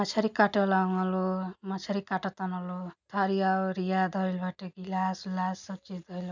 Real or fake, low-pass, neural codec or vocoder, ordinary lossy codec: real; 7.2 kHz; none; none